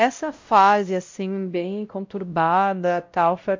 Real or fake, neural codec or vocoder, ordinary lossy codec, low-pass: fake; codec, 16 kHz, 0.5 kbps, X-Codec, WavLM features, trained on Multilingual LibriSpeech; MP3, 64 kbps; 7.2 kHz